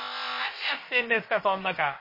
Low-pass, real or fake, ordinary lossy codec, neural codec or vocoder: 5.4 kHz; fake; MP3, 24 kbps; codec, 16 kHz, about 1 kbps, DyCAST, with the encoder's durations